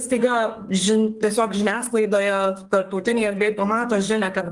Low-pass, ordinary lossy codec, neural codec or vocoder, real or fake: 10.8 kHz; Opus, 24 kbps; codec, 32 kHz, 1.9 kbps, SNAC; fake